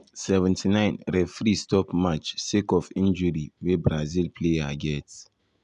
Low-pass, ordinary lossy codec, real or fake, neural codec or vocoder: 10.8 kHz; none; real; none